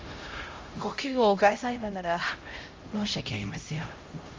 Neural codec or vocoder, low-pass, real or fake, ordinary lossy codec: codec, 16 kHz, 0.5 kbps, X-Codec, HuBERT features, trained on LibriSpeech; 7.2 kHz; fake; Opus, 32 kbps